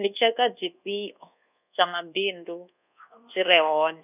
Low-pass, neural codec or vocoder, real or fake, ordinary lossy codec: 3.6 kHz; codec, 24 kHz, 1.2 kbps, DualCodec; fake; none